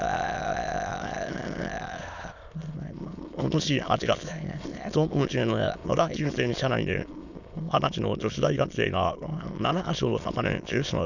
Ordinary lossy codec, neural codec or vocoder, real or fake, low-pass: none; autoencoder, 22.05 kHz, a latent of 192 numbers a frame, VITS, trained on many speakers; fake; 7.2 kHz